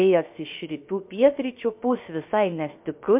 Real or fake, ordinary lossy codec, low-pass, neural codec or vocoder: fake; MP3, 32 kbps; 3.6 kHz; codec, 16 kHz, 0.7 kbps, FocalCodec